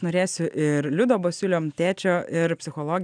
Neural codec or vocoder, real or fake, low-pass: none; real; 9.9 kHz